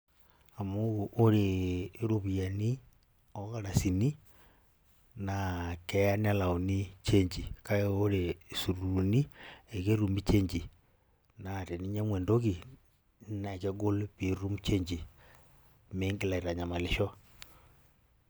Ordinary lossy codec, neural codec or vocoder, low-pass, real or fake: none; none; none; real